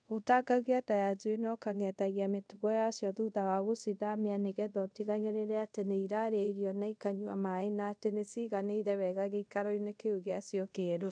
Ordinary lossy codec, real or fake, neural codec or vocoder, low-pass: none; fake; codec, 24 kHz, 0.5 kbps, DualCodec; 9.9 kHz